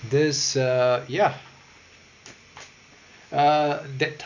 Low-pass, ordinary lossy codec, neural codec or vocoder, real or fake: 7.2 kHz; none; none; real